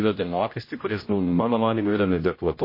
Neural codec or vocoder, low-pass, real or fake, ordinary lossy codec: codec, 16 kHz, 0.5 kbps, X-Codec, HuBERT features, trained on general audio; 5.4 kHz; fake; MP3, 24 kbps